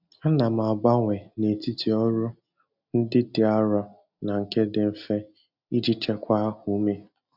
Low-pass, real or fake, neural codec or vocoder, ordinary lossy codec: 5.4 kHz; real; none; none